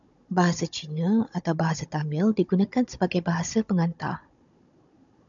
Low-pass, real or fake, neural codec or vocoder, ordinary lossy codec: 7.2 kHz; fake; codec, 16 kHz, 16 kbps, FunCodec, trained on Chinese and English, 50 frames a second; MP3, 96 kbps